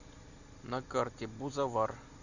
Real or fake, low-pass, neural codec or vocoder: real; 7.2 kHz; none